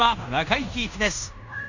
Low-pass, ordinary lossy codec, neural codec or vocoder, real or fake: 7.2 kHz; none; codec, 16 kHz in and 24 kHz out, 0.9 kbps, LongCat-Audio-Codec, fine tuned four codebook decoder; fake